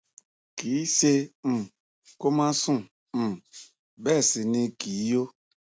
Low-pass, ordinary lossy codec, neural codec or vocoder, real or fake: none; none; none; real